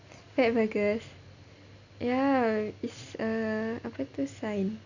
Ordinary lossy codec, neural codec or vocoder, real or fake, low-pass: none; none; real; 7.2 kHz